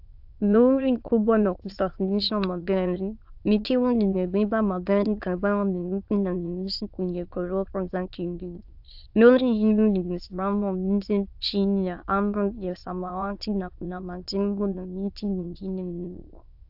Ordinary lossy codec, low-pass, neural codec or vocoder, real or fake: AAC, 48 kbps; 5.4 kHz; autoencoder, 22.05 kHz, a latent of 192 numbers a frame, VITS, trained on many speakers; fake